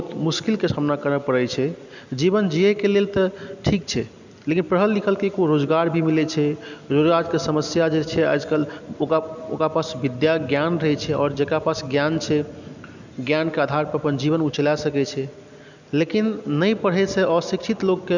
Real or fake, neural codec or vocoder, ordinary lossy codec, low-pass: real; none; none; 7.2 kHz